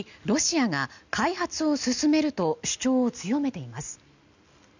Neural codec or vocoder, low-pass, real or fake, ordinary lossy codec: none; 7.2 kHz; real; none